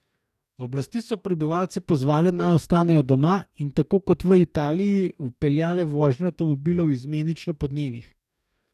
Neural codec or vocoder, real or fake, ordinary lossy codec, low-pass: codec, 44.1 kHz, 2.6 kbps, DAC; fake; none; 14.4 kHz